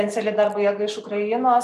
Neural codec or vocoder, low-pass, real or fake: none; 14.4 kHz; real